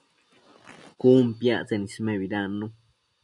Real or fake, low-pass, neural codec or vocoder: real; 10.8 kHz; none